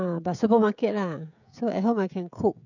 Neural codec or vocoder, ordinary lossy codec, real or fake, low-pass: codec, 16 kHz, 16 kbps, FreqCodec, smaller model; none; fake; 7.2 kHz